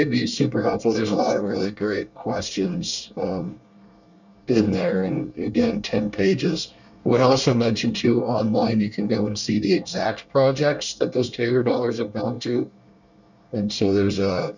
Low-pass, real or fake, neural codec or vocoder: 7.2 kHz; fake; codec, 24 kHz, 1 kbps, SNAC